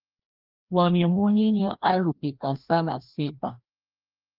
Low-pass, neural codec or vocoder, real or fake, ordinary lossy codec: 5.4 kHz; codec, 16 kHz, 1 kbps, FreqCodec, larger model; fake; Opus, 32 kbps